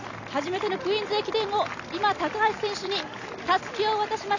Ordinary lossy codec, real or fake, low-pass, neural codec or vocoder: none; real; 7.2 kHz; none